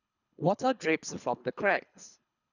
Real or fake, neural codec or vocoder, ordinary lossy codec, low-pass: fake; codec, 24 kHz, 3 kbps, HILCodec; none; 7.2 kHz